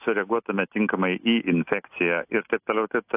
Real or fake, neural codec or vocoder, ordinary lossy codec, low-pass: real; none; AAC, 32 kbps; 3.6 kHz